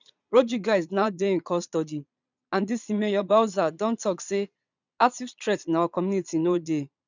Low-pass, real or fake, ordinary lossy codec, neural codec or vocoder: 7.2 kHz; fake; none; vocoder, 22.05 kHz, 80 mel bands, Vocos